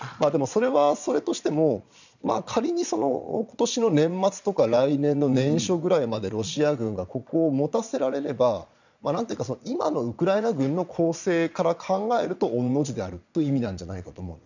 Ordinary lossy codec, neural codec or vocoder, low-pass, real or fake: none; vocoder, 22.05 kHz, 80 mel bands, Vocos; 7.2 kHz; fake